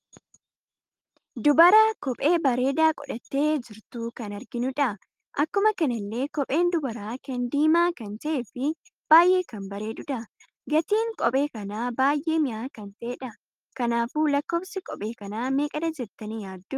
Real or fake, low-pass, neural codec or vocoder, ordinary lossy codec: real; 14.4 kHz; none; Opus, 32 kbps